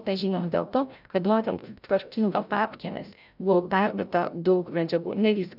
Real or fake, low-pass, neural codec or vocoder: fake; 5.4 kHz; codec, 16 kHz, 0.5 kbps, FreqCodec, larger model